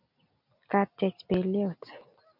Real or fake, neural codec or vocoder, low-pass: real; none; 5.4 kHz